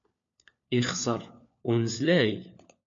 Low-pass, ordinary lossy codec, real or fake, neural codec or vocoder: 7.2 kHz; MP3, 48 kbps; fake; codec, 16 kHz, 4 kbps, FunCodec, trained on LibriTTS, 50 frames a second